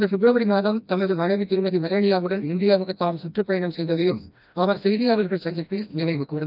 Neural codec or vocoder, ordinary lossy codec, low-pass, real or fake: codec, 16 kHz, 1 kbps, FreqCodec, smaller model; none; 5.4 kHz; fake